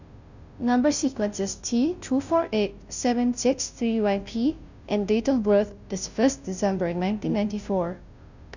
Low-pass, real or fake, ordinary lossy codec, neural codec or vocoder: 7.2 kHz; fake; none; codec, 16 kHz, 0.5 kbps, FunCodec, trained on Chinese and English, 25 frames a second